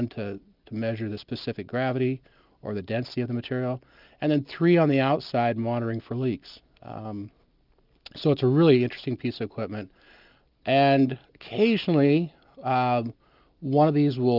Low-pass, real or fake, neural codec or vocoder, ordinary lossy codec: 5.4 kHz; real; none; Opus, 24 kbps